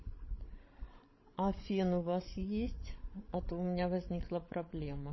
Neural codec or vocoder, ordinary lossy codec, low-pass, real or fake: codec, 16 kHz, 16 kbps, FreqCodec, larger model; MP3, 24 kbps; 7.2 kHz; fake